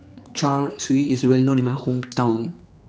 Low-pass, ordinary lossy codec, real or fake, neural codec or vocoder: none; none; fake; codec, 16 kHz, 2 kbps, X-Codec, HuBERT features, trained on general audio